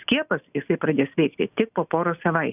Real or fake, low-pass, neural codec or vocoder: real; 3.6 kHz; none